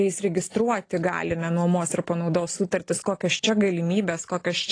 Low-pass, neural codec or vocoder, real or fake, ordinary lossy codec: 9.9 kHz; none; real; AAC, 32 kbps